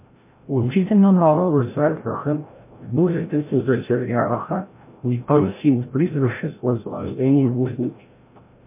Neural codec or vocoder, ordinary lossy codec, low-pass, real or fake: codec, 16 kHz, 0.5 kbps, FreqCodec, larger model; MP3, 24 kbps; 3.6 kHz; fake